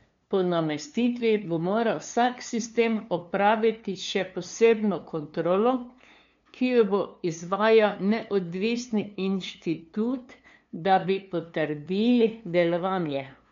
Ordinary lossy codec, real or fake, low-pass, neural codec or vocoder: MP3, 64 kbps; fake; 7.2 kHz; codec, 16 kHz, 2 kbps, FunCodec, trained on LibriTTS, 25 frames a second